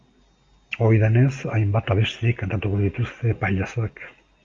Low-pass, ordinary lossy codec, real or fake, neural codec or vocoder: 7.2 kHz; Opus, 32 kbps; real; none